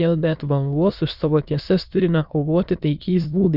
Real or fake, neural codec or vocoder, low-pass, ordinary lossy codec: fake; autoencoder, 22.05 kHz, a latent of 192 numbers a frame, VITS, trained on many speakers; 5.4 kHz; Opus, 64 kbps